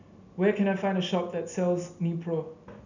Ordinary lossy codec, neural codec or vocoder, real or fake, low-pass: none; none; real; 7.2 kHz